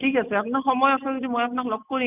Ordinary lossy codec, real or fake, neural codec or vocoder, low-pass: none; real; none; 3.6 kHz